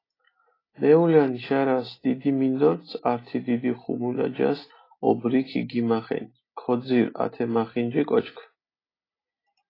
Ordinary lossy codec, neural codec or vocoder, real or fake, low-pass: AAC, 24 kbps; none; real; 5.4 kHz